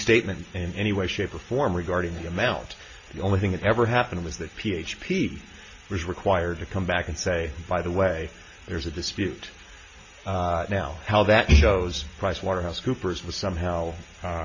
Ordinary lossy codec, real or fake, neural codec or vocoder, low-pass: MP3, 32 kbps; real; none; 7.2 kHz